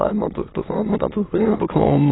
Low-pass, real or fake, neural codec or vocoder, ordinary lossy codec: 7.2 kHz; fake; autoencoder, 22.05 kHz, a latent of 192 numbers a frame, VITS, trained on many speakers; AAC, 16 kbps